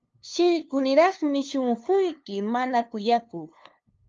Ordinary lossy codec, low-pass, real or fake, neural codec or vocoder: Opus, 24 kbps; 7.2 kHz; fake; codec, 16 kHz, 2 kbps, FunCodec, trained on LibriTTS, 25 frames a second